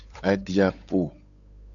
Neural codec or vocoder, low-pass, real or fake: codec, 16 kHz, 8 kbps, FunCodec, trained on Chinese and English, 25 frames a second; 7.2 kHz; fake